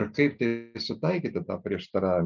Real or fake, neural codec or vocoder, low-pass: real; none; 7.2 kHz